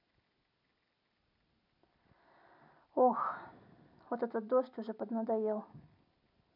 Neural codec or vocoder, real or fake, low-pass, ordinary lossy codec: none; real; 5.4 kHz; MP3, 48 kbps